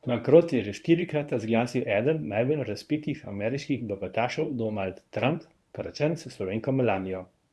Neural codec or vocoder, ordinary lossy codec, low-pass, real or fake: codec, 24 kHz, 0.9 kbps, WavTokenizer, medium speech release version 1; none; none; fake